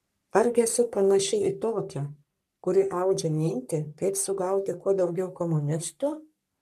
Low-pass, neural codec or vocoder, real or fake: 14.4 kHz; codec, 44.1 kHz, 3.4 kbps, Pupu-Codec; fake